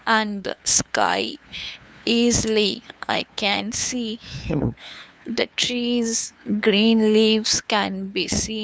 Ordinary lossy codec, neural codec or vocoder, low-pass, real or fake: none; codec, 16 kHz, 2 kbps, FunCodec, trained on LibriTTS, 25 frames a second; none; fake